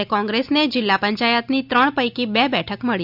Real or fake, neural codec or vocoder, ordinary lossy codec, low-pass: real; none; none; 5.4 kHz